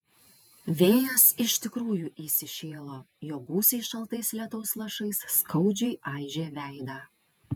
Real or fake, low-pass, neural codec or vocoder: fake; 19.8 kHz; vocoder, 48 kHz, 128 mel bands, Vocos